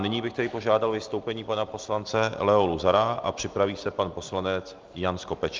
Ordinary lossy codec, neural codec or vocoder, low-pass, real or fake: Opus, 32 kbps; none; 7.2 kHz; real